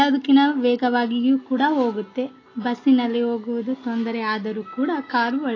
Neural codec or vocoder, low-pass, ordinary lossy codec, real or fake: none; 7.2 kHz; AAC, 32 kbps; real